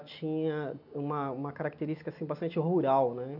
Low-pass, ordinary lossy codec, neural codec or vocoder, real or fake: 5.4 kHz; none; none; real